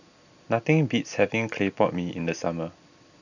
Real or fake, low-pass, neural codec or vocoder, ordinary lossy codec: real; 7.2 kHz; none; none